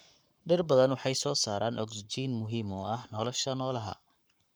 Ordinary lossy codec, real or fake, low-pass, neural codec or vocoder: none; fake; none; codec, 44.1 kHz, 7.8 kbps, Pupu-Codec